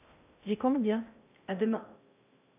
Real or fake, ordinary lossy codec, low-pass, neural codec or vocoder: fake; AAC, 32 kbps; 3.6 kHz; codec, 16 kHz in and 24 kHz out, 0.6 kbps, FocalCodec, streaming, 2048 codes